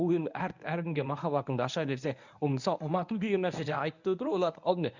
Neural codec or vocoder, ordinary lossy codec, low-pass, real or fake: codec, 24 kHz, 0.9 kbps, WavTokenizer, medium speech release version 1; none; 7.2 kHz; fake